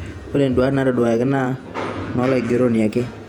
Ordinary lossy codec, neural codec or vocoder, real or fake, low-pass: none; vocoder, 48 kHz, 128 mel bands, Vocos; fake; 19.8 kHz